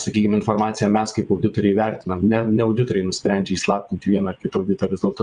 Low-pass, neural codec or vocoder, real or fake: 9.9 kHz; vocoder, 22.05 kHz, 80 mel bands, WaveNeXt; fake